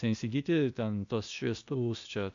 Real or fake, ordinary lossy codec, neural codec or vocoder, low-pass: fake; AAC, 64 kbps; codec, 16 kHz, 0.8 kbps, ZipCodec; 7.2 kHz